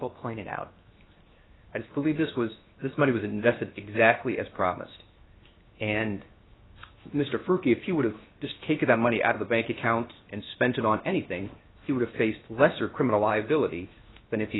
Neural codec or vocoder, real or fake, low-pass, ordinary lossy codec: codec, 16 kHz, 0.7 kbps, FocalCodec; fake; 7.2 kHz; AAC, 16 kbps